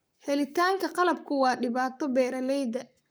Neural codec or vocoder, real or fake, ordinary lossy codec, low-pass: codec, 44.1 kHz, 7.8 kbps, Pupu-Codec; fake; none; none